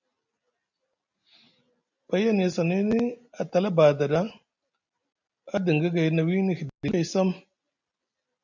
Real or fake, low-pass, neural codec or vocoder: real; 7.2 kHz; none